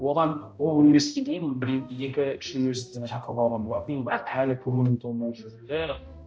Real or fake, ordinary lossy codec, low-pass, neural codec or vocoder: fake; none; none; codec, 16 kHz, 0.5 kbps, X-Codec, HuBERT features, trained on balanced general audio